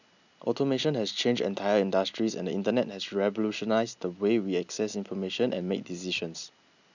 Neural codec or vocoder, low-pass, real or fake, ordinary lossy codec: none; 7.2 kHz; real; none